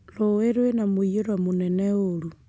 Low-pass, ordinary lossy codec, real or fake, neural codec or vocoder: none; none; real; none